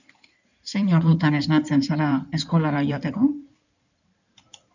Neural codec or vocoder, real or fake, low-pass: codec, 16 kHz in and 24 kHz out, 2.2 kbps, FireRedTTS-2 codec; fake; 7.2 kHz